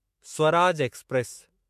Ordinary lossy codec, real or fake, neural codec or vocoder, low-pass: MP3, 64 kbps; fake; autoencoder, 48 kHz, 128 numbers a frame, DAC-VAE, trained on Japanese speech; 14.4 kHz